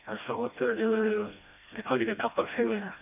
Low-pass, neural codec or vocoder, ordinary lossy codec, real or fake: 3.6 kHz; codec, 16 kHz, 1 kbps, FreqCodec, smaller model; none; fake